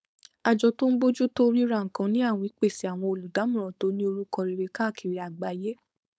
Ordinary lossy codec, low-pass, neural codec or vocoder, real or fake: none; none; codec, 16 kHz, 4.8 kbps, FACodec; fake